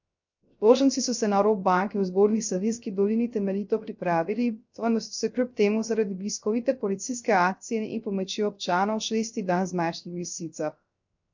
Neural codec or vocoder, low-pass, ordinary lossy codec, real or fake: codec, 16 kHz, 0.3 kbps, FocalCodec; 7.2 kHz; MP3, 48 kbps; fake